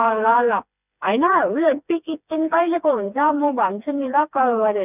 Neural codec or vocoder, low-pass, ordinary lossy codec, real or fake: codec, 16 kHz, 2 kbps, FreqCodec, smaller model; 3.6 kHz; none; fake